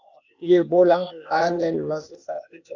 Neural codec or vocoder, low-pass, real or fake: codec, 16 kHz, 0.8 kbps, ZipCodec; 7.2 kHz; fake